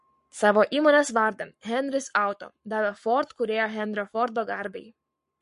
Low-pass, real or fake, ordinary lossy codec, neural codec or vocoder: 14.4 kHz; fake; MP3, 48 kbps; codec, 44.1 kHz, 7.8 kbps, Pupu-Codec